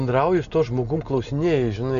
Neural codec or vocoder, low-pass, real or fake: none; 7.2 kHz; real